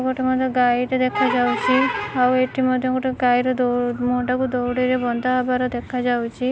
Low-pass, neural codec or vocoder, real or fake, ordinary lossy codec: none; none; real; none